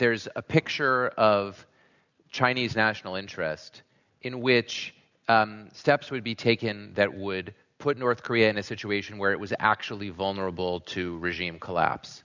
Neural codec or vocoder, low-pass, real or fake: none; 7.2 kHz; real